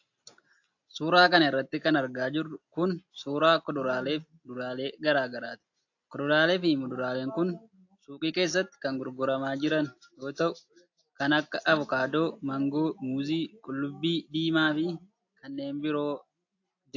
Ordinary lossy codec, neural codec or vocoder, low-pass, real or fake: AAC, 48 kbps; none; 7.2 kHz; real